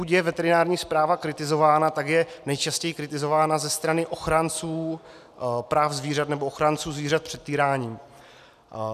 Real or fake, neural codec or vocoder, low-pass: real; none; 14.4 kHz